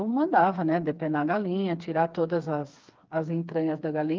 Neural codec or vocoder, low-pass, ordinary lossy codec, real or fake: codec, 16 kHz, 4 kbps, FreqCodec, smaller model; 7.2 kHz; Opus, 32 kbps; fake